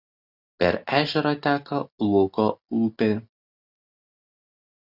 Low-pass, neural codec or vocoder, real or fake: 5.4 kHz; none; real